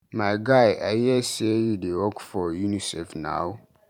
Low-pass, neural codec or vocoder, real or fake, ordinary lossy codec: 19.8 kHz; vocoder, 44.1 kHz, 128 mel bands every 512 samples, BigVGAN v2; fake; none